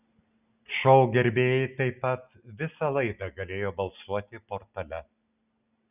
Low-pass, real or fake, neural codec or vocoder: 3.6 kHz; real; none